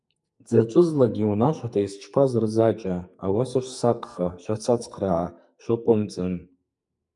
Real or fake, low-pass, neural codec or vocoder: fake; 10.8 kHz; codec, 44.1 kHz, 2.6 kbps, SNAC